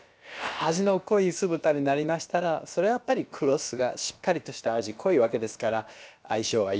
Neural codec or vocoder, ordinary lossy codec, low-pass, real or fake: codec, 16 kHz, about 1 kbps, DyCAST, with the encoder's durations; none; none; fake